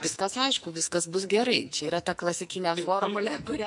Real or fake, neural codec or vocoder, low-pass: fake; codec, 44.1 kHz, 2.6 kbps, SNAC; 10.8 kHz